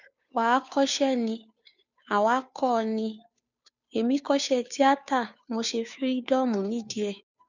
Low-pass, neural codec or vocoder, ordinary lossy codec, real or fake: 7.2 kHz; codec, 16 kHz, 2 kbps, FunCodec, trained on Chinese and English, 25 frames a second; none; fake